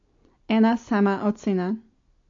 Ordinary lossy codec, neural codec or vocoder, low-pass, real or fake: AAC, 48 kbps; none; 7.2 kHz; real